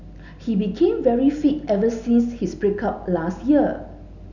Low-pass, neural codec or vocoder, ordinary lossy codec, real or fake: 7.2 kHz; none; none; real